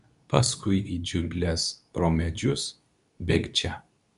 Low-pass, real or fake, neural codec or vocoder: 10.8 kHz; fake; codec, 24 kHz, 0.9 kbps, WavTokenizer, medium speech release version 2